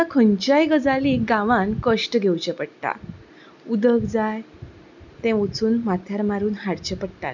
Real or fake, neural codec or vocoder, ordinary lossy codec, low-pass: real; none; none; 7.2 kHz